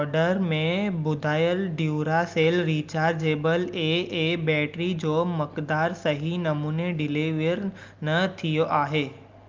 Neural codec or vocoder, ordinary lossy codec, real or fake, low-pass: none; Opus, 24 kbps; real; 7.2 kHz